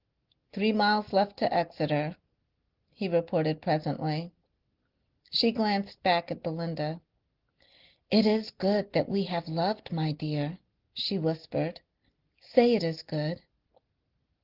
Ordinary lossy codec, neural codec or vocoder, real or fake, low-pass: Opus, 16 kbps; none; real; 5.4 kHz